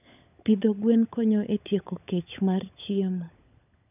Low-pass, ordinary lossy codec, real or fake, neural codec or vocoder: 3.6 kHz; none; fake; codec, 16 kHz, 16 kbps, FunCodec, trained on LibriTTS, 50 frames a second